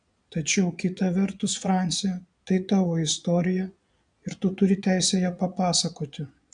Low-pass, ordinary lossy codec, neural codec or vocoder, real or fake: 9.9 kHz; MP3, 96 kbps; vocoder, 22.05 kHz, 80 mel bands, Vocos; fake